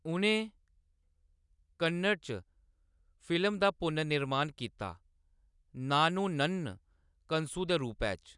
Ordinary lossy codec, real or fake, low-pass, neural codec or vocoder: none; real; 10.8 kHz; none